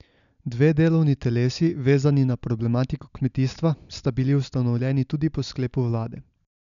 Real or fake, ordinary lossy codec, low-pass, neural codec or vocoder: real; none; 7.2 kHz; none